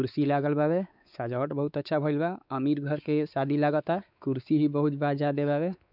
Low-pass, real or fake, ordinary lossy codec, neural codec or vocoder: 5.4 kHz; fake; none; codec, 16 kHz, 4 kbps, X-Codec, WavLM features, trained on Multilingual LibriSpeech